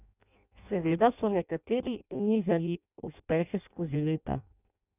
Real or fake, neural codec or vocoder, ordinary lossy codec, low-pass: fake; codec, 16 kHz in and 24 kHz out, 0.6 kbps, FireRedTTS-2 codec; none; 3.6 kHz